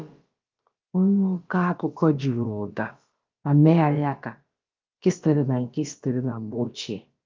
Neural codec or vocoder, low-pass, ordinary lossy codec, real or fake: codec, 16 kHz, about 1 kbps, DyCAST, with the encoder's durations; 7.2 kHz; Opus, 32 kbps; fake